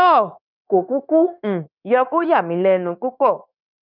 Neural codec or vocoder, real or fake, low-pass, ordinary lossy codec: codec, 16 kHz, 0.9 kbps, LongCat-Audio-Codec; fake; 5.4 kHz; none